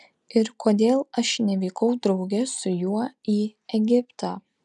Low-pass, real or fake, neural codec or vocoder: 10.8 kHz; real; none